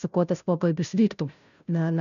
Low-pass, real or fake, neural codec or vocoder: 7.2 kHz; fake; codec, 16 kHz, 0.5 kbps, FunCodec, trained on Chinese and English, 25 frames a second